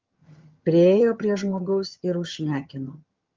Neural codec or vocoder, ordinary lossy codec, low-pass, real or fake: vocoder, 22.05 kHz, 80 mel bands, HiFi-GAN; Opus, 32 kbps; 7.2 kHz; fake